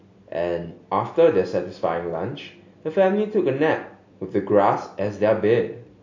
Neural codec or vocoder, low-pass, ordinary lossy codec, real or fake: none; 7.2 kHz; AAC, 48 kbps; real